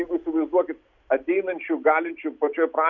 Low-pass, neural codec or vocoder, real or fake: 7.2 kHz; none; real